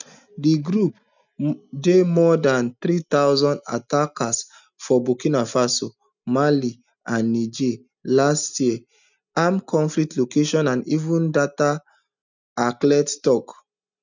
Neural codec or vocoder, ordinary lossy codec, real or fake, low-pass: none; none; real; 7.2 kHz